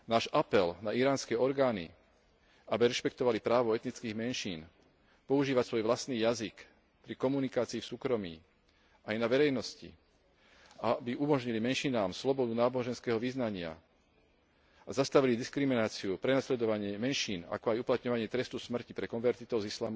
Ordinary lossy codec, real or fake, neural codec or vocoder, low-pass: none; real; none; none